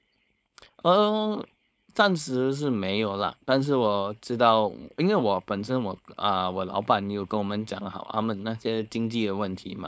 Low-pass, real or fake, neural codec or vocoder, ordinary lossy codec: none; fake; codec, 16 kHz, 4.8 kbps, FACodec; none